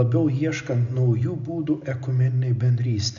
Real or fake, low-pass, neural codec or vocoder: real; 7.2 kHz; none